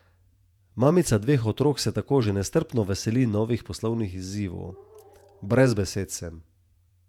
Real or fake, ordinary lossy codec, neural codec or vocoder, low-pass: fake; none; vocoder, 48 kHz, 128 mel bands, Vocos; 19.8 kHz